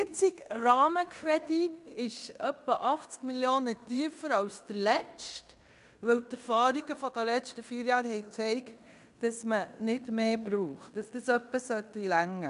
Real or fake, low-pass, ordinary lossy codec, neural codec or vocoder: fake; 10.8 kHz; MP3, 96 kbps; codec, 16 kHz in and 24 kHz out, 0.9 kbps, LongCat-Audio-Codec, fine tuned four codebook decoder